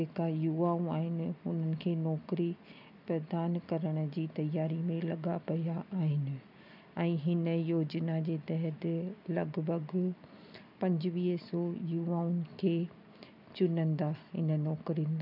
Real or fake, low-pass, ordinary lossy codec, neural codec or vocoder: real; 5.4 kHz; none; none